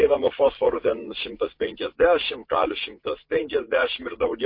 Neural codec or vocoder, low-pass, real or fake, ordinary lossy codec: vocoder, 44.1 kHz, 80 mel bands, Vocos; 5.4 kHz; fake; MP3, 32 kbps